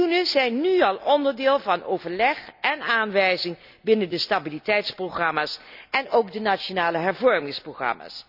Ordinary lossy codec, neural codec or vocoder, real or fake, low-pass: none; none; real; 5.4 kHz